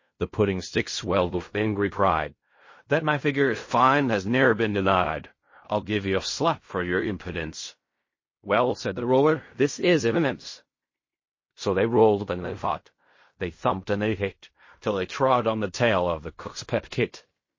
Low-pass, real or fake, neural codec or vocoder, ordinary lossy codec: 7.2 kHz; fake; codec, 16 kHz in and 24 kHz out, 0.4 kbps, LongCat-Audio-Codec, fine tuned four codebook decoder; MP3, 32 kbps